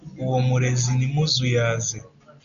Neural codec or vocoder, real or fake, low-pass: none; real; 7.2 kHz